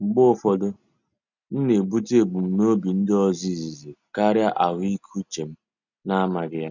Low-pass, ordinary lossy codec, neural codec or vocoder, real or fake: 7.2 kHz; none; none; real